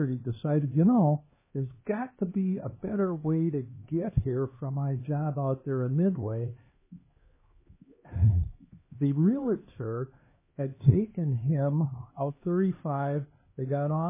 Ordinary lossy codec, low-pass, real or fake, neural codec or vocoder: MP3, 16 kbps; 3.6 kHz; fake; codec, 16 kHz, 2 kbps, X-Codec, HuBERT features, trained on LibriSpeech